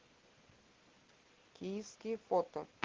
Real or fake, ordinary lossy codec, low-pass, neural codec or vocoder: real; Opus, 16 kbps; 7.2 kHz; none